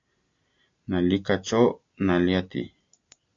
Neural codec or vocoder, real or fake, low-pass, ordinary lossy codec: none; real; 7.2 kHz; AAC, 64 kbps